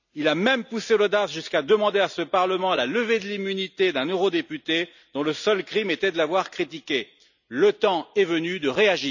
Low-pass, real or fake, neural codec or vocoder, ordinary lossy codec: 7.2 kHz; real; none; none